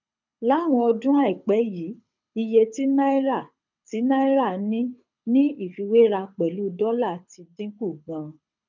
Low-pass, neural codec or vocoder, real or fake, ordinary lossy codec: 7.2 kHz; codec, 24 kHz, 6 kbps, HILCodec; fake; none